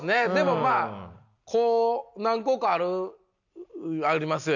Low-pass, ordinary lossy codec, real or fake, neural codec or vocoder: 7.2 kHz; none; real; none